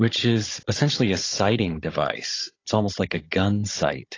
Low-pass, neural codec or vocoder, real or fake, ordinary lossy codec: 7.2 kHz; codec, 16 kHz, 16 kbps, FunCodec, trained on Chinese and English, 50 frames a second; fake; AAC, 32 kbps